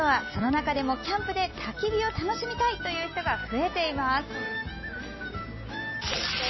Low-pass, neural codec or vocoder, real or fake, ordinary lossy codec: 7.2 kHz; none; real; MP3, 24 kbps